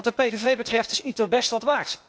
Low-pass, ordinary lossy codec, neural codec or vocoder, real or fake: none; none; codec, 16 kHz, 0.8 kbps, ZipCodec; fake